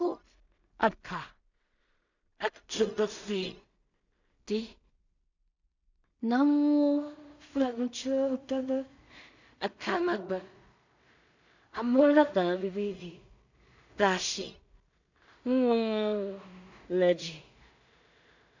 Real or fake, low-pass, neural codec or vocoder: fake; 7.2 kHz; codec, 16 kHz in and 24 kHz out, 0.4 kbps, LongCat-Audio-Codec, two codebook decoder